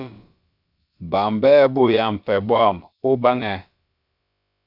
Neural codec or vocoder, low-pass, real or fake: codec, 16 kHz, about 1 kbps, DyCAST, with the encoder's durations; 5.4 kHz; fake